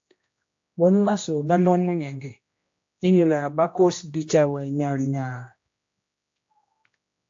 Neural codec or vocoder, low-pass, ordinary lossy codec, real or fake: codec, 16 kHz, 1 kbps, X-Codec, HuBERT features, trained on general audio; 7.2 kHz; AAC, 48 kbps; fake